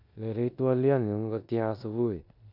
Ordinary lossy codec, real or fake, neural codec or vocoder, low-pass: none; fake; codec, 16 kHz in and 24 kHz out, 0.9 kbps, LongCat-Audio-Codec, four codebook decoder; 5.4 kHz